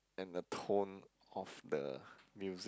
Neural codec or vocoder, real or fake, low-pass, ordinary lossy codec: none; real; none; none